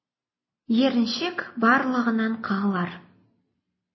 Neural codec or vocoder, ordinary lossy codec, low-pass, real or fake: none; MP3, 24 kbps; 7.2 kHz; real